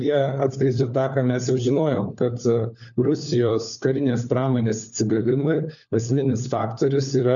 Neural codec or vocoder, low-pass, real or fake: codec, 16 kHz, 4 kbps, FunCodec, trained on LibriTTS, 50 frames a second; 7.2 kHz; fake